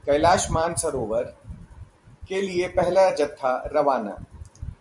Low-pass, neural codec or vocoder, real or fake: 10.8 kHz; vocoder, 44.1 kHz, 128 mel bands every 256 samples, BigVGAN v2; fake